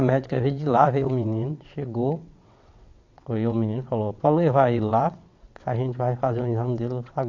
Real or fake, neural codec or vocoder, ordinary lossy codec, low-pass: fake; vocoder, 22.05 kHz, 80 mel bands, WaveNeXt; AAC, 48 kbps; 7.2 kHz